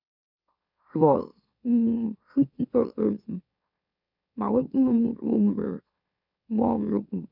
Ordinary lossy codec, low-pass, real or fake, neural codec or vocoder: none; 5.4 kHz; fake; autoencoder, 44.1 kHz, a latent of 192 numbers a frame, MeloTTS